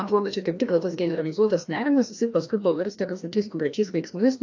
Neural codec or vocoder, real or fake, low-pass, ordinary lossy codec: codec, 16 kHz, 1 kbps, FreqCodec, larger model; fake; 7.2 kHz; AAC, 48 kbps